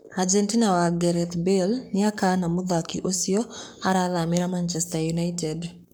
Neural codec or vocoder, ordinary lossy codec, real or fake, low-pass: codec, 44.1 kHz, 7.8 kbps, Pupu-Codec; none; fake; none